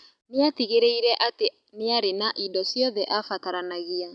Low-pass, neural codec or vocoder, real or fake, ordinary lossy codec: 9.9 kHz; none; real; none